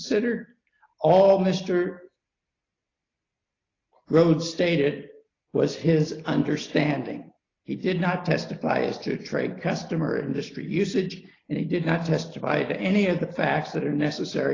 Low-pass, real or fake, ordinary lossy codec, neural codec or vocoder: 7.2 kHz; real; AAC, 32 kbps; none